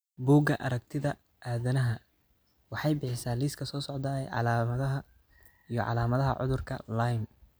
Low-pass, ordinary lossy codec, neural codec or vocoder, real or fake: none; none; none; real